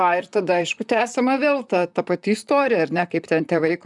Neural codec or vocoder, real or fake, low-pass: vocoder, 44.1 kHz, 128 mel bands, Pupu-Vocoder; fake; 10.8 kHz